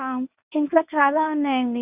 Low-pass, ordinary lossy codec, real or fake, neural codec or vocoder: 3.6 kHz; Opus, 64 kbps; fake; codec, 24 kHz, 0.9 kbps, WavTokenizer, medium speech release version 1